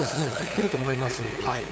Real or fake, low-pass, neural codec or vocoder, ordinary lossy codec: fake; none; codec, 16 kHz, 8 kbps, FunCodec, trained on LibriTTS, 25 frames a second; none